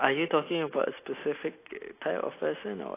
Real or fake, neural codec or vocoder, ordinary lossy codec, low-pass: real; none; AAC, 24 kbps; 3.6 kHz